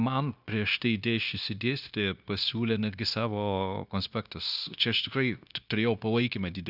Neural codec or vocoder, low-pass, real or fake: codec, 16 kHz, 0.9 kbps, LongCat-Audio-Codec; 5.4 kHz; fake